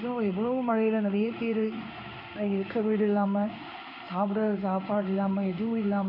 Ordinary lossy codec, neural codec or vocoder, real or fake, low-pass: none; codec, 16 kHz in and 24 kHz out, 1 kbps, XY-Tokenizer; fake; 5.4 kHz